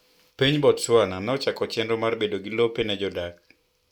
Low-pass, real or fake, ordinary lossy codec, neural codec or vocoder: 19.8 kHz; real; none; none